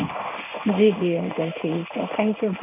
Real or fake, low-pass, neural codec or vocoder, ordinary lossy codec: fake; 3.6 kHz; codec, 16 kHz in and 24 kHz out, 1 kbps, XY-Tokenizer; none